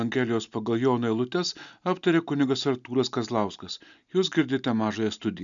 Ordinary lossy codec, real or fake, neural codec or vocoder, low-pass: MP3, 96 kbps; real; none; 7.2 kHz